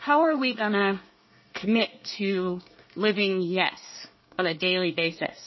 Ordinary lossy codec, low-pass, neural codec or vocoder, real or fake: MP3, 24 kbps; 7.2 kHz; codec, 24 kHz, 1 kbps, SNAC; fake